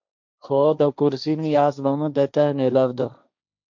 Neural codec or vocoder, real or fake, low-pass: codec, 16 kHz, 1.1 kbps, Voila-Tokenizer; fake; 7.2 kHz